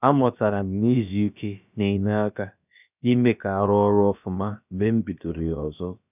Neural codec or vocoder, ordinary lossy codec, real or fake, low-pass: codec, 16 kHz, about 1 kbps, DyCAST, with the encoder's durations; none; fake; 3.6 kHz